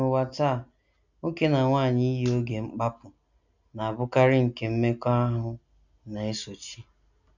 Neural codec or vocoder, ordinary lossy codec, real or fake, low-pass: none; none; real; 7.2 kHz